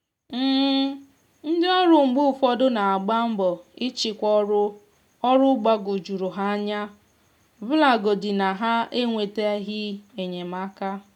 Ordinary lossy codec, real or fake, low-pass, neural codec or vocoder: none; real; 19.8 kHz; none